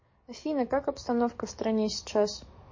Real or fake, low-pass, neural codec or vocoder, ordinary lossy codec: fake; 7.2 kHz; codec, 44.1 kHz, 7.8 kbps, DAC; MP3, 32 kbps